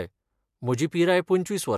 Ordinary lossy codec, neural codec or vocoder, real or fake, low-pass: MP3, 96 kbps; none; real; 14.4 kHz